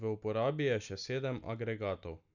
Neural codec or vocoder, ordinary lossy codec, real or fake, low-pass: none; none; real; 7.2 kHz